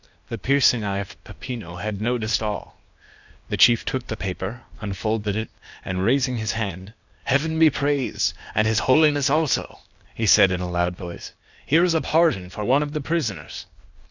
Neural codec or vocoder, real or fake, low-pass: codec, 16 kHz, 0.8 kbps, ZipCodec; fake; 7.2 kHz